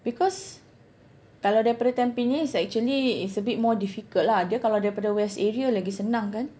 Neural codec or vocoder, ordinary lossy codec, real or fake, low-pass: none; none; real; none